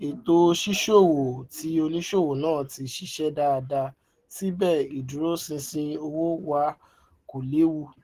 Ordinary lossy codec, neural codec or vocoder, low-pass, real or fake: Opus, 16 kbps; none; 14.4 kHz; real